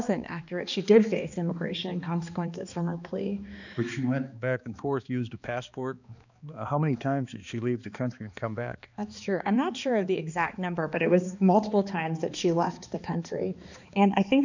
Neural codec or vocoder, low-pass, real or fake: codec, 16 kHz, 2 kbps, X-Codec, HuBERT features, trained on balanced general audio; 7.2 kHz; fake